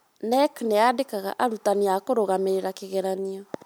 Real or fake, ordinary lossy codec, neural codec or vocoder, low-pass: real; none; none; none